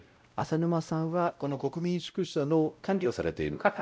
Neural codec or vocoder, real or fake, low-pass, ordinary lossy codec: codec, 16 kHz, 0.5 kbps, X-Codec, WavLM features, trained on Multilingual LibriSpeech; fake; none; none